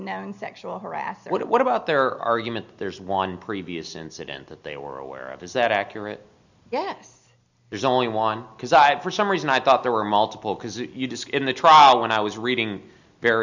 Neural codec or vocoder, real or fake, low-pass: none; real; 7.2 kHz